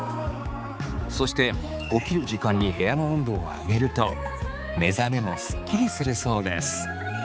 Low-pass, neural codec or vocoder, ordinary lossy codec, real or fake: none; codec, 16 kHz, 4 kbps, X-Codec, HuBERT features, trained on balanced general audio; none; fake